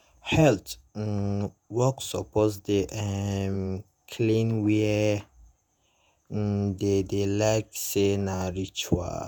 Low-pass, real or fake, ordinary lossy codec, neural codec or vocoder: none; fake; none; vocoder, 48 kHz, 128 mel bands, Vocos